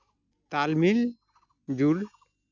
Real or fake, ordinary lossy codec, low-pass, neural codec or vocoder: real; none; 7.2 kHz; none